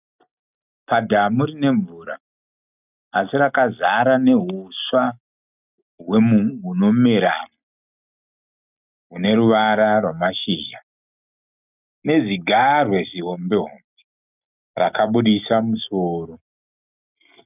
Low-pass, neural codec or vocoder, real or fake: 3.6 kHz; none; real